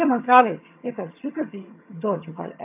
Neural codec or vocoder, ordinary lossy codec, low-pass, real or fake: vocoder, 22.05 kHz, 80 mel bands, HiFi-GAN; MP3, 32 kbps; 3.6 kHz; fake